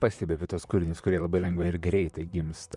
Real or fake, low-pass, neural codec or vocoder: fake; 10.8 kHz; vocoder, 44.1 kHz, 128 mel bands, Pupu-Vocoder